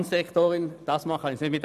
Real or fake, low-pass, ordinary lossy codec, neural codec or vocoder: real; 14.4 kHz; none; none